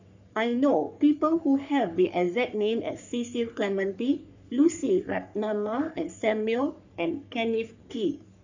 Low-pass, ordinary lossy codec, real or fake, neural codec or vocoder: 7.2 kHz; none; fake; codec, 44.1 kHz, 3.4 kbps, Pupu-Codec